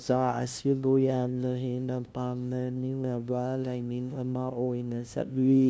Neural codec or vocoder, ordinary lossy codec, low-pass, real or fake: codec, 16 kHz, 0.5 kbps, FunCodec, trained on LibriTTS, 25 frames a second; none; none; fake